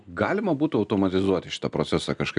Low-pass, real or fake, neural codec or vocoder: 9.9 kHz; real; none